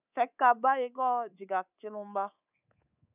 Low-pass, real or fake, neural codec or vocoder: 3.6 kHz; fake; codec, 24 kHz, 3.1 kbps, DualCodec